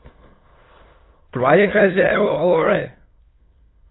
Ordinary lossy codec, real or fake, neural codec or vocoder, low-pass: AAC, 16 kbps; fake; autoencoder, 22.05 kHz, a latent of 192 numbers a frame, VITS, trained on many speakers; 7.2 kHz